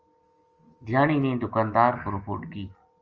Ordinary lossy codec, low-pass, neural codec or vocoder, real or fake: Opus, 24 kbps; 7.2 kHz; none; real